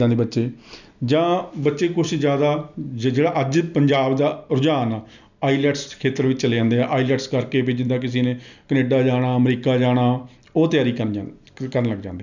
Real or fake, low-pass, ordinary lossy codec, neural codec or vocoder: real; 7.2 kHz; none; none